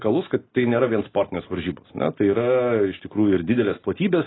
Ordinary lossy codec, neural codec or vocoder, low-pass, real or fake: AAC, 16 kbps; none; 7.2 kHz; real